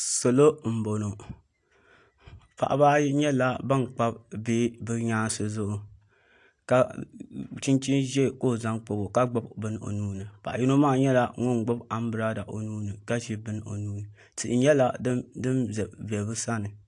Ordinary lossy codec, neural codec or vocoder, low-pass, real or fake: AAC, 64 kbps; none; 10.8 kHz; real